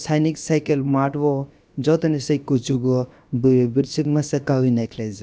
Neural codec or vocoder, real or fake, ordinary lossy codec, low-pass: codec, 16 kHz, about 1 kbps, DyCAST, with the encoder's durations; fake; none; none